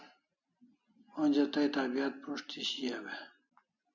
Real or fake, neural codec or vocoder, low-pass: real; none; 7.2 kHz